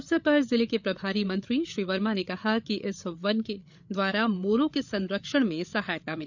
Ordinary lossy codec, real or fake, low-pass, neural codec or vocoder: none; fake; 7.2 kHz; codec, 16 kHz, 8 kbps, FreqCodec, larger model